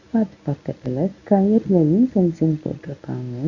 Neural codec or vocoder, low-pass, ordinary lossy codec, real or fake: codec, 24 kHz, 0.9 kbps, WavTokenizer, medium speech release version 1; 7.2 kHz; none; fake